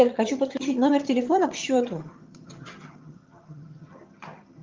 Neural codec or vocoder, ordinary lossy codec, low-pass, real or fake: vocoder, 22.05 kHz, 80 mel bands, HiFi-GAN; Opus, 24 kbps; 7.2 kHz; fake